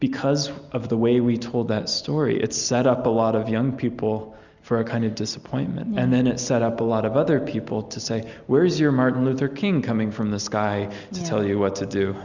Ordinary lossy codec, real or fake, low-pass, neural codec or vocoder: Opus, 64 kbps; real; 7.2 kHz; none